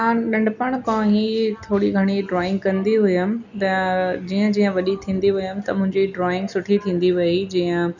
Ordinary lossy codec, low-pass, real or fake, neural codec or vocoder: none; 7.2 kHz; real; none